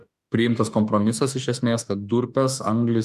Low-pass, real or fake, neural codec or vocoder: 14.4 kHz; fake; autoencoder, 48 kHz, 32 numbers a frame, DAC-VAE, trained on Japanese speech